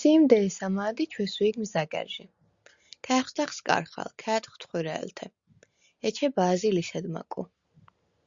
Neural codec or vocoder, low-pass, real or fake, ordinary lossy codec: none; 7.2 kHz; real; Opus, 64 kbps